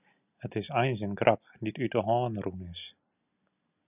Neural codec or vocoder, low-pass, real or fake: none; 3.6 kHz; real